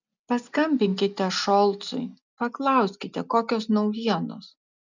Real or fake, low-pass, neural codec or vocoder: real; 7.2 kHz; none